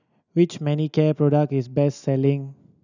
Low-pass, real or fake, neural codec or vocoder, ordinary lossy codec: 7.2 kHz; real; none; none